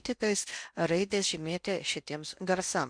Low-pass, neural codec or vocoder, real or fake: 9.9 kHz; codec, 16 kHz in and 24 kHz out, 0.6 kbps, FocalCodec, streaming, 4096 codes; fake